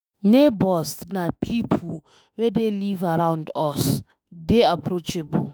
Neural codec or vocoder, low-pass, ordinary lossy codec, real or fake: autoencoder, 48 kHz, 32 numbers a frame, DAC-VAE, trained on Japanese speech; none; none; fake